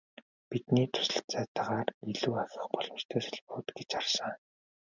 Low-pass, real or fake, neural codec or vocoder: 7.2 kHz; real; none